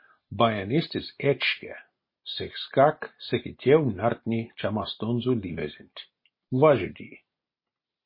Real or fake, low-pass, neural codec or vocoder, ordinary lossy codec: real; 5.4 kHz; none; MP3, 24 kbps